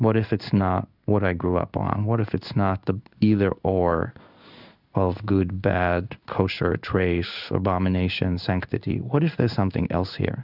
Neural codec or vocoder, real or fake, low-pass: codec, 16 kHz in and 24 kHz out, 1 kbps, XY-Tokenizer; fake; 5.4 kHz